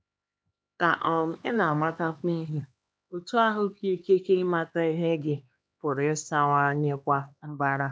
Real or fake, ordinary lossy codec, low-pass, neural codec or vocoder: fake; none; none; codec, 16 kHz, 2 kbps, X-Codec, HuBERT features, trained on LibriSpeech